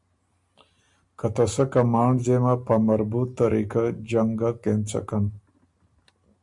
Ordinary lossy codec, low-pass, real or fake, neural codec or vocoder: MP3, 96 kbps; 10.8 kHz; real; none